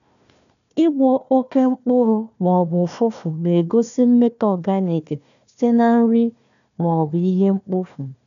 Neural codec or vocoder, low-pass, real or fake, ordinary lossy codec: codec, 16 kHz, 1 kbps, FunCodec, trained on Chinese and English, 50 frames a second; 7.2 kHz; fake; none